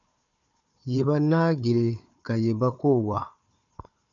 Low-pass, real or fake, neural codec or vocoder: 7.2 kHz; fake; codec, 16 kHz, 4 kbps, FunCodec, trained on Chinese and English, 50 frames a second